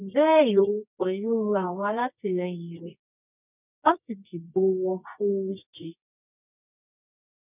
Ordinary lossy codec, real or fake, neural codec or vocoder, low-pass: none; fake; codec, 24 kHz, 0.9 kbps, WavTokenizer, medium music audio release; 3.6 kHz